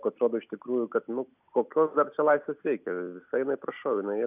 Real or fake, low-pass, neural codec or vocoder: real; 3.6 kHz; none